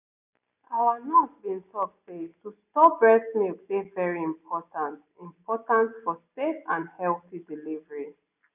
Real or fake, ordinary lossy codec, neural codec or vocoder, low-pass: real; none; none; 3.6 kHz